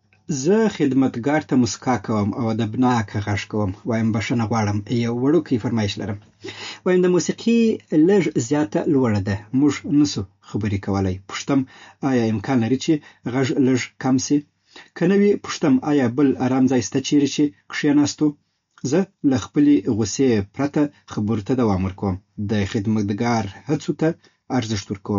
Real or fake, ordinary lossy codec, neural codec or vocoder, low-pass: real; MP3, 48 kbps; none; 7.2 kHz